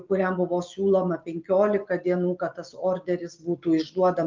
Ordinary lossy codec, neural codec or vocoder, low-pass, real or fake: Opus, 24 kbps; none; 7.2 kHz; real